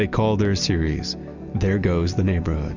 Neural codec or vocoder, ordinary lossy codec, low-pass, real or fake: none; Opus, 64 kbps; 7.2 kHz; real